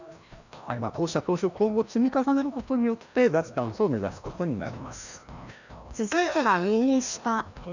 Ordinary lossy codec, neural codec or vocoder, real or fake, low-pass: none; codec, 16 kHz, 1 kbps, FreqCodec, larger model; fake; 7.2 kHz